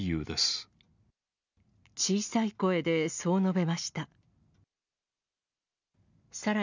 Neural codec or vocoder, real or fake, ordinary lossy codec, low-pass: none; real; none; 7.2 kHz